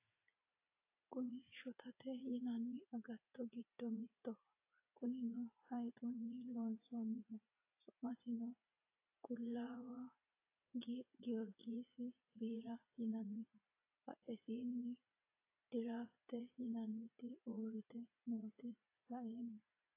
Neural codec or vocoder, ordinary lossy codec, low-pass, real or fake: vocoder, 44.1 kHz, 80 mel bands, Vocos; AAC, 24 kbps; 3.6 kHz; fake